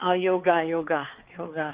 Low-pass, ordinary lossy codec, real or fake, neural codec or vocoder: 3.6 kHz; Opus, 16 kbps; fake; codec, 16 kHz, 4 kbps, X-Codec, HuBERT features, trained on balanced general audio